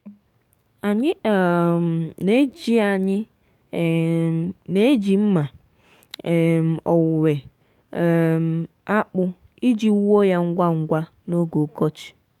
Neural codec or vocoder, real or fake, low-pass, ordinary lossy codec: codec, 44.1 kHz, 7.8 kbps, DAC; fake; 19.8 kHz; none